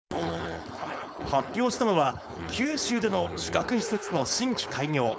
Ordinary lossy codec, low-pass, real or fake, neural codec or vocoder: none; none; fake; codec, 16 kHz, 4.8 kbps, FACodec